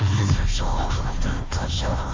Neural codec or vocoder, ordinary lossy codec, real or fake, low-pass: codec, 16 kHz, 1 kbps, FunCodec, trained on Chinese and English, 50 frames a second; Opus, 32 kbps; fake; 7.2 kHz